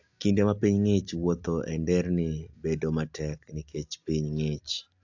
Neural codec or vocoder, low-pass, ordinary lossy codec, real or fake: none; 7.2 kHz; AAC, 48 kbps; real